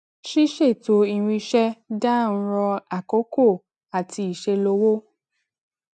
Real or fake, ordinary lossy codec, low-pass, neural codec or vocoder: real; none; 10.8 kHz; none